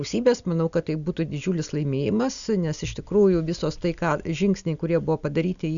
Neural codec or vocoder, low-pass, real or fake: none; 7.2 kHz; real